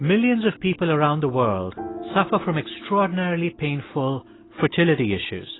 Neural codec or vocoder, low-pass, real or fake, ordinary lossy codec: none; 7.2 kHz; real; AAC, 16 kbps